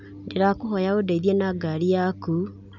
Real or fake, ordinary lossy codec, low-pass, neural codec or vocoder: real; none; 7.2 kHz; none